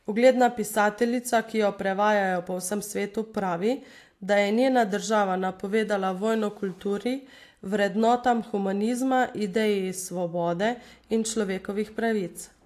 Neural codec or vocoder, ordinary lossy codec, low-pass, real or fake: none; AAC, 64 kbps; 14.4 kHz; real